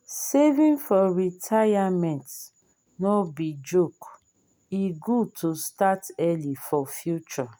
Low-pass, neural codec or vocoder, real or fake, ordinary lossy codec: none; none; real; none